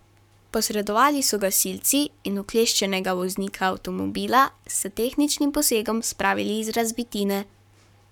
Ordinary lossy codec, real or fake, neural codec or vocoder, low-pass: none; fake; codec, 44.1 kHz, 7.8 kbps, Pupu-Codec; 19.8 kHz